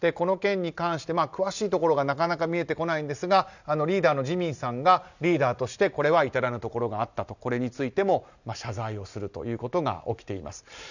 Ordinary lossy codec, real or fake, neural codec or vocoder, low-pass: none; real; none; 7.2 kHz